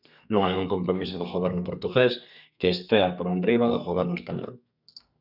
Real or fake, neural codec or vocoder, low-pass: fake; codec, 32 kHz, 1.9 kbps, SNAC; 5.4 kHz